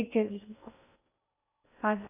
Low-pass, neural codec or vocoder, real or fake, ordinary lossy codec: 3.6 kHz; codec, 16 kHz in and 24 kHz out, 0.6 kbps, FocalCodec, streaming, 4096 codes; fake; none